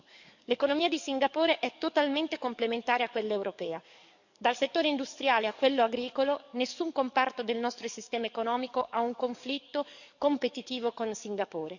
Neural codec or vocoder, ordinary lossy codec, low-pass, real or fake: codec, 44.1 kHz, 7.8 kbps, DAC; none; 7.2 kHz; fake